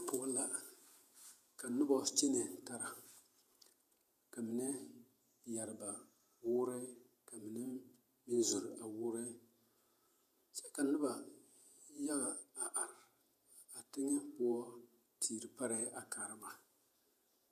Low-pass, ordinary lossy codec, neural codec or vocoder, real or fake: 14.4 kHz; MP3, 96 kbps; vocoder, 48 kHz, 128 mel bands, Vocos; fake